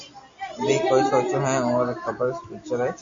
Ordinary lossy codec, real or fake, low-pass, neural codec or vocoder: MP3, 48 kbps; real; 7.2 kHz; none